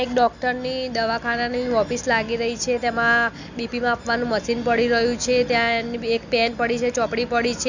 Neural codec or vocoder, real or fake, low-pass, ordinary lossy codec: none; real; 7.2 kHz; none